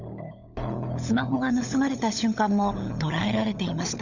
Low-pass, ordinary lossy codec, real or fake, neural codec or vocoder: 7.2 kHz; none; fake; codec, 16 kHz, 16 kbps, FunCodec, trained on LibriTTS, 50 frames a second